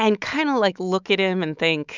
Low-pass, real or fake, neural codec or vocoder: 7.2 kHz; fake; autoencoder, 48 kHz, 128 numbers a frame, DAC-VAE, trained on Japanese speech